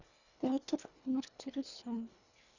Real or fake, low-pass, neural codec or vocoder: fake; 7.2 kHz; codec, 24 kHz, 1.5 kbps, HILCodec